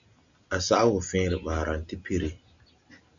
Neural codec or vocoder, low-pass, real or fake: none; 7.2 kHz; real